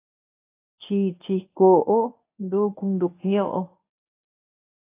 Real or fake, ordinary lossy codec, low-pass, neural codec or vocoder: fake; AAC, 24 kbps; 3.6 kHz; codec, 24 kHz, 0.9 kbps, WavTokenizer, medium speech release version 1